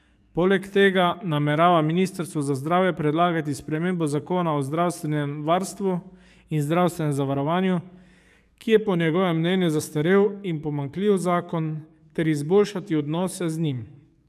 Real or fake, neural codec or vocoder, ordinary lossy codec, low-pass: fake; codec, 44.1 kHz, 7.8 kbps, DAC; none; 14.4 kHz